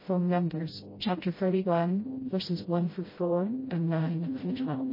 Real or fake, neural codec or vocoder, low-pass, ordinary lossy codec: fake; codec, 16 kHz, 0.5 kbps, FreqCodec, smaller model; 5.4 kHz; MP3, 24 kbps